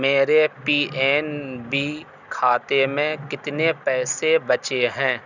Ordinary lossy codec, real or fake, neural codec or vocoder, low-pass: none; real; none; 7.2 kHz